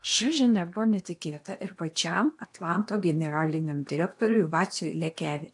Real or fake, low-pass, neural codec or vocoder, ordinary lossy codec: fake; 10.8 kHz; codec, 16 kHz in and 24 kHz out, 0.8 kbps, FocalCodec, streaming, 65536 codes; AAC, 64 kbps